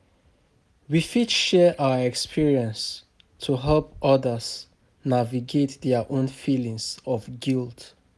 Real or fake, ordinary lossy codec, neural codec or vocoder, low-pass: real; none; none; none